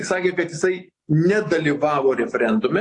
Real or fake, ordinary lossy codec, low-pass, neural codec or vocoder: real; AAC, 48 kbps; 10.8 kHz; none